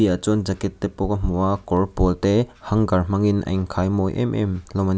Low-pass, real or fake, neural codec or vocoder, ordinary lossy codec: none; real; none; none